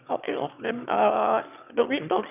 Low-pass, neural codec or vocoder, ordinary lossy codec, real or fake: 3.6 kHz; autoencoder, 22.05 kHz, a latent of 192 numbers a frame, VITS, trained on one speaker; none; fake